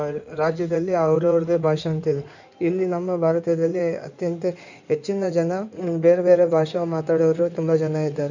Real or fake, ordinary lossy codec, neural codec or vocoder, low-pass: fake; none; codec, 16 kHz in and 24 kHz out, 2.2 kbps, FireRedTTS-2 codec; 7.2 kHz